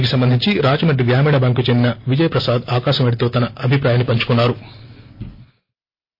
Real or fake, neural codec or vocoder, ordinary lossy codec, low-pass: real; none; MP3, 32 kbps; 5.4 kHz